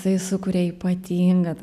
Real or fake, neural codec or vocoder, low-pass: real; none; 14.4 kHz